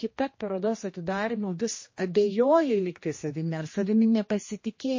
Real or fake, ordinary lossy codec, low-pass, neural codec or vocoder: fake; MP3, 32 kbps; 7.2 kHz; codec, 16 kHz, 1 kbps, X-Codec, HuBERT features, trained on general audio